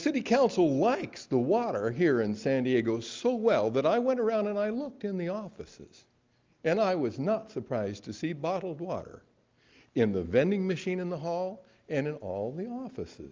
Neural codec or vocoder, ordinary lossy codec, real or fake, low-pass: none; Opus, 32 kbps; real; 7.2 kHz